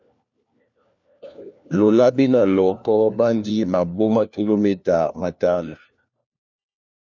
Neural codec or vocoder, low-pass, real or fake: codec, 16 kHz, 1 kbps, FunCodec, trained on LibriTTS, 50 frames a second; 7.2 kHz; fake